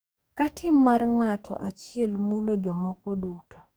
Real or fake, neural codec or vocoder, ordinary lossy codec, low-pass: fake; codec, 44.1 kHz, 2.6 kbps, DAC; none; none